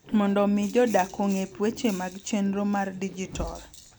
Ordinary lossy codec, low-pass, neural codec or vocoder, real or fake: none; none; none; real